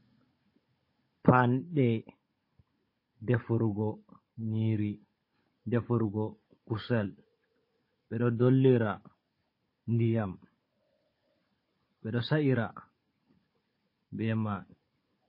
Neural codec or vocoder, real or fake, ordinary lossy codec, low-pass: codec, 16 kHz, 16 kbps, FunCodec, trained on Chinese and English, 50 frames a second; fake; MP3, 24 kbps; 5.4 kHz